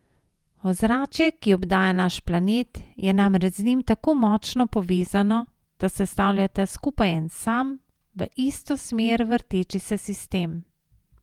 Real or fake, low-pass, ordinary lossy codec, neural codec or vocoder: fake; 19.8 kHz; Opus, 32 kbps; vocoder, 48 kHz, 128 mel bands, Vocos